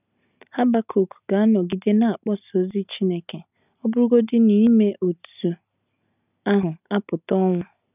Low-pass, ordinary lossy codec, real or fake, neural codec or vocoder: 3.6 kHz; none; real; none